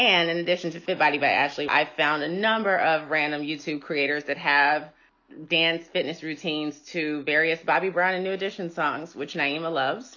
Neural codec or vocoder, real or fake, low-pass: none; real; 7.2 kHz